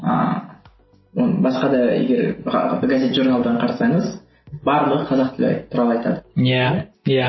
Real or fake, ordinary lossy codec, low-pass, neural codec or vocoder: real; MP3, 24 kbps; 7.2 kHz; none